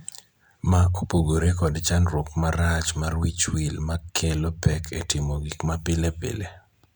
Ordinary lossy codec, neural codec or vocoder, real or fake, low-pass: none; none; real; none